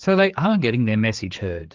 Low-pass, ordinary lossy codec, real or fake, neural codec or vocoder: 7.2 kHz; Opus, 32 kbps; fake; codec, 16 kHz, 4 kbps, X-Codec, HuBERT features, trained on general audio